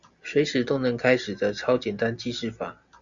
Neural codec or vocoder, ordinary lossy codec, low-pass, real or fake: none; Opus, 64 kbps; 7.2 kHz; real